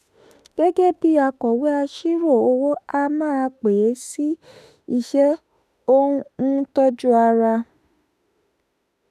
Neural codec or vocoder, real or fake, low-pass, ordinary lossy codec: autoencoder, 48 kHz, 32 numbers a frame, DAC-VAE, trained on Japanese speech; fake; 14.4 kHz; none